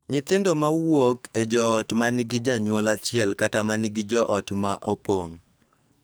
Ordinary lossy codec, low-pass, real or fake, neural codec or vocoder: none; none; fake; codec, 44.1 kHz, 2.6 kbps, SNAC